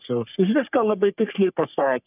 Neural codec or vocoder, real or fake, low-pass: codec, 44.1 kHz, 3.4 kbps, Pupu-Codec; fake; 3.6 kHz